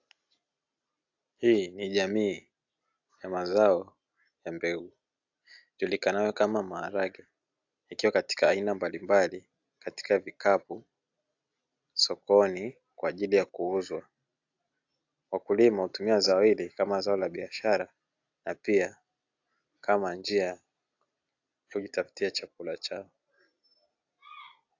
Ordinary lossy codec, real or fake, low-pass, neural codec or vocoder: AAC, 48 kbps; real; 7.2 kHz; none